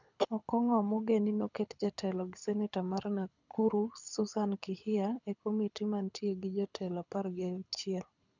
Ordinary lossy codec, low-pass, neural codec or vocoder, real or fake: none; 7.2 kHz; codec, 24 kHz, 6 kbps, HILCodec; fake